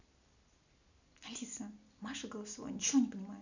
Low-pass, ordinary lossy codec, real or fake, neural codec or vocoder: 7.2 kHz; none; real; none